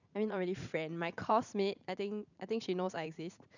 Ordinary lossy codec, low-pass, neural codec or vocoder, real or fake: none; 7.2 kHz; vocoder, 44.1 kHz, 80 mel bands, Vocos; fake